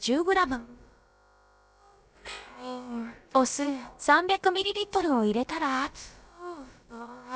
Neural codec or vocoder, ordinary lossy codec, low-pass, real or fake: codec, 16 kHz, about 1 kbps, DyCAST, with the encoder's durations; none; none; fake